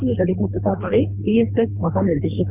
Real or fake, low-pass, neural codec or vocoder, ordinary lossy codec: fake; 3.6 kHz; codec, 24 kHz, 3 kbps, HILCodec; none